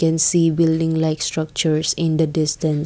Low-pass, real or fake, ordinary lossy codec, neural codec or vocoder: none; real; none; none